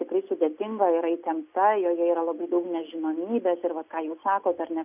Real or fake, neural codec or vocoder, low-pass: real; none; 3.6 kHz